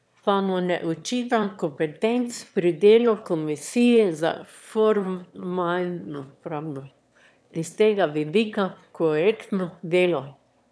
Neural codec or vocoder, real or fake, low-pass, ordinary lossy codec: autoencoder, 22.05 kHz, a latent of 192 numbers a frame, VITS, trained on one speaker; fake; none; none